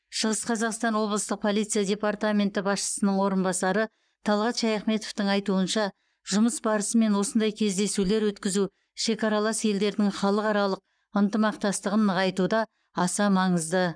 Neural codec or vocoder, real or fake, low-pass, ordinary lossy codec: codec, 44.1 kHz, 7.8 kbps, Pupu-Codec; fake; 9.9 kHz; none